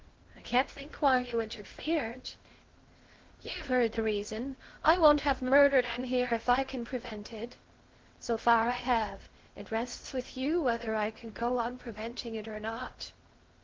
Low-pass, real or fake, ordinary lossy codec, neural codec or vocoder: 7.2 kHz; fake; Opus, 16 kbps; codec, 16 kHz in and 24 kHz out, 0.6 kbps, FocalCodec, streaming, 2048 codes